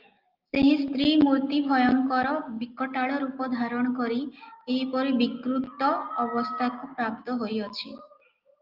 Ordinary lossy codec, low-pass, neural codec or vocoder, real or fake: Opus, 24 kbps; 5.4 kHz; none; real